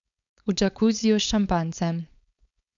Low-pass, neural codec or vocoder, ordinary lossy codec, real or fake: 7.2 kHz; codec, 16 kHz, 4.8 kbps, FACodec; none; fake